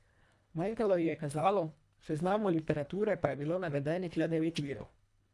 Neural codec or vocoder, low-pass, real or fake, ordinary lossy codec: codec, 24 kHz, 1.5 kbps, HILCodec; none; fake; none